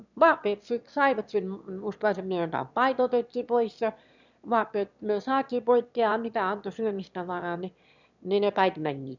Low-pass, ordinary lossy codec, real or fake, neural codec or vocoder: 7.2 kHz; none; fake; autoencoder, 22.05 kHz, a latent of 192 numbers a frame, VITS, trained on one speaker